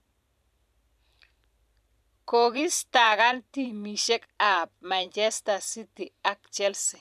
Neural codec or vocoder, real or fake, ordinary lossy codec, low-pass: vocoder, 44.1 kHz, 128 mel bands every 512 samples, BigVGAN v2; fake; none; 14.4 kHz